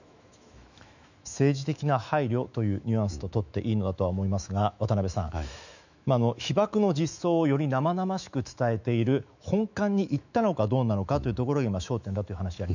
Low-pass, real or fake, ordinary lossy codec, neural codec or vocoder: 7.2 kHz; fake; none; autoencoder, 48 kHz, 128 numbers a frame, DAC-VAE, trained on Japanese speech